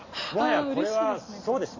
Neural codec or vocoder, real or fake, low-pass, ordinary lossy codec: none; real; 7.2 kHz; MP3, 32 kbps